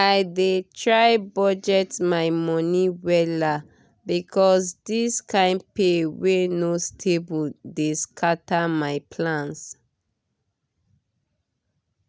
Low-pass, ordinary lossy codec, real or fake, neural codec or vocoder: none; none; real; none